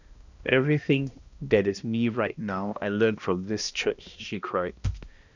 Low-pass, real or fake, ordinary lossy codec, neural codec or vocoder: 7.2 kHz; fake; none; codec, 16 kHz, 1 kbps, X-Codec, HuBERT features, trained on balanced general audio